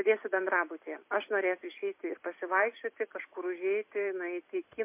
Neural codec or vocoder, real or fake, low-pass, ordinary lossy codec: vocoder, 44.1 kHz, 128 mel bands every 256 samples, BigVGAN v2; fake; 3.6 kHz; MP3, 24 kbps